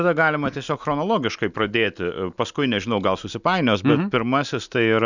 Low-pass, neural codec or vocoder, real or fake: 7.2 kHz; none; real